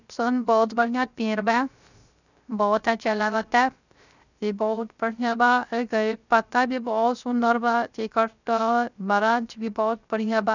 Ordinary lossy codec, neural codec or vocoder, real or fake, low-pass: none; codec, 16 kHz, 0.3 kbps, FocalCodec; fake; 7.2 kHz